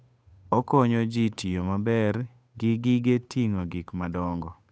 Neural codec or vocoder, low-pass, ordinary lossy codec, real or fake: codec, 16 kHz, 8 kbps, FunCodec, trained on Chinese and English, 25 frames a second; none; none; fake